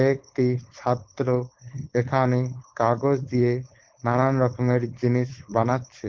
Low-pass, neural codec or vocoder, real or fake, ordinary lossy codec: 7.2 kHz; codec, 16 kHz, 4.8 kbps, FACodec; fake; Opus, 16 kbps